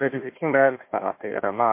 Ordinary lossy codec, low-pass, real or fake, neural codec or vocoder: MP3, 24 kbps; 3.6 kHz; fake; codec, 16 kHz, 1 kbps, FunCodec, trained on Chinese and English, 50 frames a second